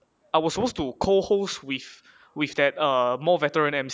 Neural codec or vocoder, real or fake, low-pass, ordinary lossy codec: none; real; none; none